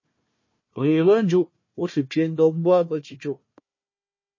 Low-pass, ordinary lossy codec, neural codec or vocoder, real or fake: 7.2 kHz; MP3, 32 kbps; codec, 16 kHz, 1 kbps, FunCodec, trained on Chinese and English, 50 frames a second; fake